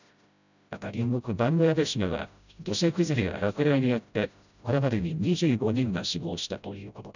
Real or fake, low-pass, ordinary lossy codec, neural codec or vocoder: fake; 7.2 kHz; none; codec, 16 kHz, 0.5 kbps, FreqCodec, smaller model